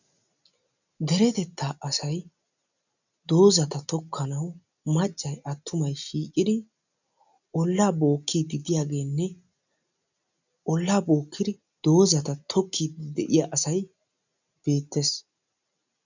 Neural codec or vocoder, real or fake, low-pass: none; real; 7.2 kHz